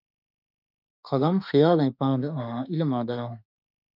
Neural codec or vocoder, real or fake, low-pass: autoencoder, 48 kHz, 32 numbers a frame, DAC-VAE, trained on Japanese speech; fake; 5.4 kHz